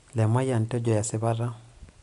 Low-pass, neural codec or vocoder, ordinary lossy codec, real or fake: 10.8 kHz; none; none; real